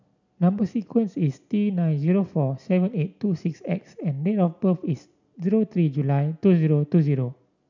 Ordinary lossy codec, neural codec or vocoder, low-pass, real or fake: none; none; 7.2 kHz; real